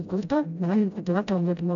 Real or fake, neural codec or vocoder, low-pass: fake; codec, 16 kHz, 0.5 kbps, FreqCodec, smaller model; 7.2 kHz